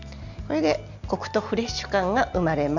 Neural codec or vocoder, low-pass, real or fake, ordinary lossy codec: none; 7.2 kHz; real; none